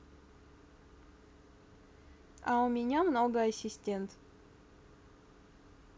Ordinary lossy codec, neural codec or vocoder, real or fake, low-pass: none; none; real; none